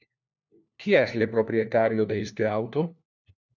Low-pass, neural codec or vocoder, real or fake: 7.2 kHz; codec, 16 kHz, 1 kbps, FunCodec, trained on LibriTTS, 50 frames a second; fake